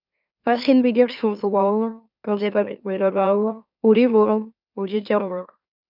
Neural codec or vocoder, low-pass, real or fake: autoencoder, 44.1 kHz, a latent of 192 numbers a frame, MeloTTS; 5.4 kHz; fake